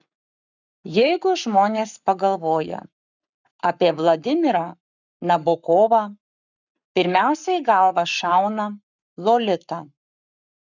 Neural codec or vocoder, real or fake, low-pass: codec, 44.1 kHz, 7.8 kbps, Pupu-Codec; fake; 7.2 kHz